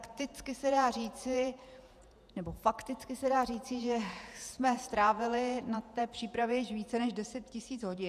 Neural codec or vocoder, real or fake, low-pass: vocoder, 48 kHz, 128 mel bands, Vocos; fake; 14.4 kHz